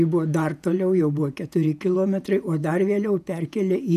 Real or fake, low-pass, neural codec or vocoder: fake; 14.4 kHz; vocoder, 48 kHz, 128 mel bands, Vocos